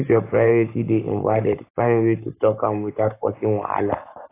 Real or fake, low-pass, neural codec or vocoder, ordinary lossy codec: fake; 3.6 kHz; codec, 44.1 kHz, 7.8 kbps, Pupu-Codec; AAC, 24 kbps